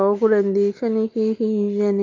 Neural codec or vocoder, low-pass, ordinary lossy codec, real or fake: none; 7.2 kHz; Opus, 24 kbps; real